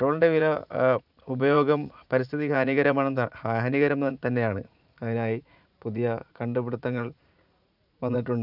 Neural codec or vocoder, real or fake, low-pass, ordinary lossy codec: vocoder, 44.1 kHz, 80 mel bands, Vocos; fake; 5.4 kHz; none